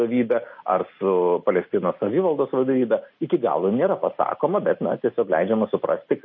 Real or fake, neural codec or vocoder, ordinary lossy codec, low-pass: real; none; MP3, 32 kbps; 7.2 kHz